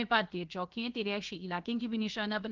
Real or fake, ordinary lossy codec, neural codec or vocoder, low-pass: fake; Opus, 32 kbps; codec, 16 kHz, about 1 kbps, DyCAST, with the encoder's durations; 7.2 kHz